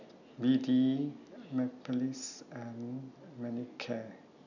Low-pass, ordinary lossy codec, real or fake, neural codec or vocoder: 7.2 kHz; none; real; none